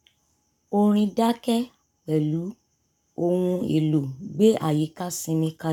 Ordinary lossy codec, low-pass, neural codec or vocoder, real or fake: none; 19.8 kHz; codec, 44.1 kHz, 7.8 kbps, Pupu-Codec; fake